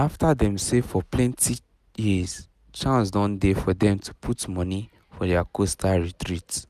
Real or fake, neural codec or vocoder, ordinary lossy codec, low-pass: real; none; none; 14.4 kHz